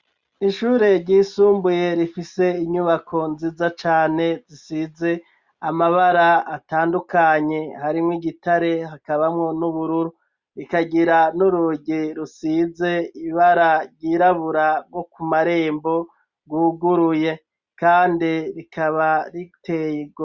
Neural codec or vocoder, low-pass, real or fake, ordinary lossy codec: none; 7.2 kHz; real; Opus, 64 kbps